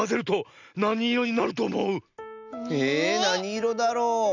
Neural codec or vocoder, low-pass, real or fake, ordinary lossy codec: none; 7.2 kHz; real; none